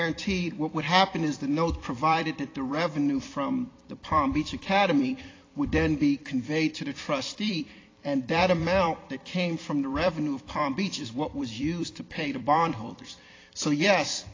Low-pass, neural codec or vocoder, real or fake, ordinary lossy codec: 7.2 kHz; vocoder, 44.1 kHz, 128 mel bands every 256 samples, BigVGAN v2; fake; AAC, 32 kbps